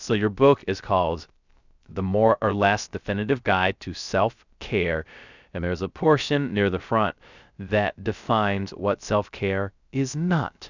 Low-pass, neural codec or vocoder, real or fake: 7.2 kHz; codec, 16 kHz, 0.3 kbps, FocalCodec; fake